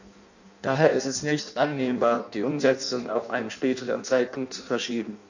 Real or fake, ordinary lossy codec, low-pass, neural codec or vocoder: fake; none; 7.2 kHz; codec, 16 kHz in and 24 kHz out, 0.6 kbps, FireRedTTS-2 codec